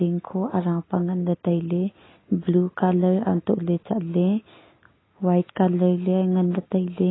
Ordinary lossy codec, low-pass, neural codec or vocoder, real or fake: AAC, 16 kbps; 7.2 kHz; none; real